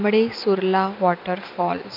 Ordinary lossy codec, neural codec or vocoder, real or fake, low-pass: none; none; real; 5.4 kHz